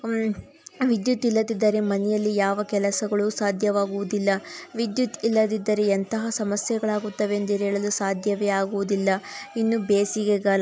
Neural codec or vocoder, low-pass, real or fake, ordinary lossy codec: none; none; real; none